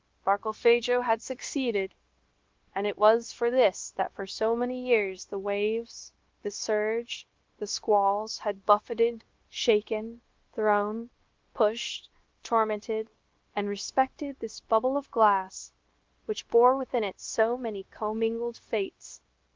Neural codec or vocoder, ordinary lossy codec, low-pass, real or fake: codec, 16 kHz, 0.9 kbps, LongCat-Audio-Codec; Opus, 16 kbps; 7.2 kHz; fake